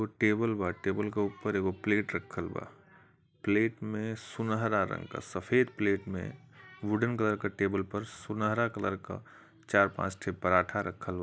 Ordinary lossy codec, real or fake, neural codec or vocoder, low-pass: none; real; none; none